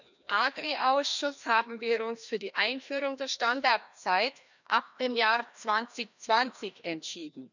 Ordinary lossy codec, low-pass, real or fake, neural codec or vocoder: none; 7.2 kHz; fake; codec, 16 kHz, 1 kbps, FreqCodec, larger model